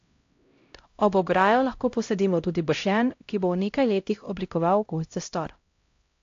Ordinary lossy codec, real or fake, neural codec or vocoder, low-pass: AAC, 48 kbps; fake; codec, 16 kHz, 0.5 kbps, X-Codec, HuBERT features, trained on LibriSpeech; 7.2 kHz